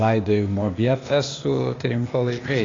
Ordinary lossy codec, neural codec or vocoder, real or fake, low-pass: AAC, 32 kbps; codec, 16 kHz, 0.8 kbps, ZipCodec; fake; 7.2 kHz